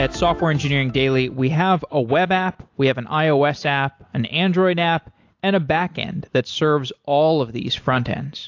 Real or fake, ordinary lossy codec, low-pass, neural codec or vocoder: real; AAC, 48 kbps; 7.2 kHz; none